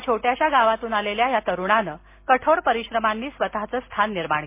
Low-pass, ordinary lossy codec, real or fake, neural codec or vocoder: 3.6 kHz; MP3, 24 kbps; real; none